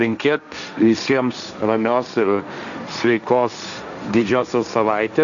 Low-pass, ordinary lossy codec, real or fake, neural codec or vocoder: 7.2 kHz; MP3, 96 kbps; fake; codec, 16 kHz, 1.1 kbps, Voila-Tokenizer